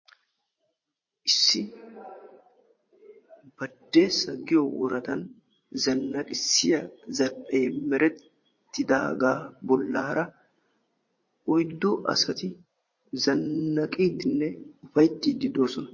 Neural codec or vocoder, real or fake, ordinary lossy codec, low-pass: vocoder, 44.1 kHz, 80 mel bands, Vocos; fake; MP3, 32 kbps; 7.2 kHz